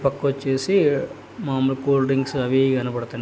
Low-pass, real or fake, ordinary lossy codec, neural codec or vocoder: none; real; none; none